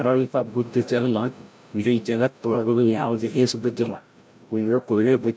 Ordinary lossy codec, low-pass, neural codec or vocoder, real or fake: none; none; codec, 16 kHz, 0.5 kbps, FreqCodec, larger model; fake